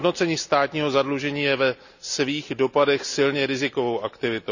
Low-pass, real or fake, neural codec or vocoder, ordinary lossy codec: 7.2 kHz; real; none; none